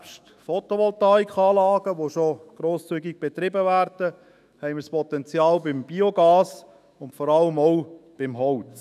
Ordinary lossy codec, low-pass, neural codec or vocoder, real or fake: none; 14.4 kHz; autoencoder, 48 kHz, 128 numbers a frame, DAC-VAE, trained on Japanese speech; fake